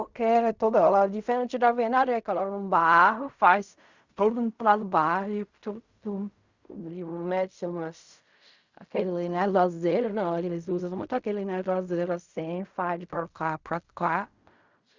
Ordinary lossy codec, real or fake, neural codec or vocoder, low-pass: Opus, 64 kbps; fake; codec, 16 kHz in and 24 kHz out, 0.4 kbps, LongCat-Audio-Codec, fine tuned four codebook decoder; 7.2 kHz